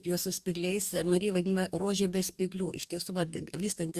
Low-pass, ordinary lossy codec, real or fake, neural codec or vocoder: 14.4 kHz; Opus, 64 kbps; fake; codec, 44.1 kHz, 2.6 kbps, DAC